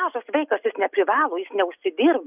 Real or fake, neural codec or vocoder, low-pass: real; none; 3.6 kHz